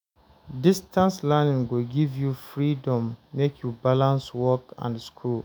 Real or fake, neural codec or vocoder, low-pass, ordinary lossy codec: fake; autoencoder, 48 kHz, 128 numbers a frame, DAC-VAE, trained on Japanese speech; none; none